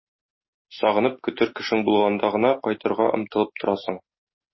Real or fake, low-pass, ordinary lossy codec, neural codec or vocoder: real; 7.2 kHz; MP3, 24 kbps; none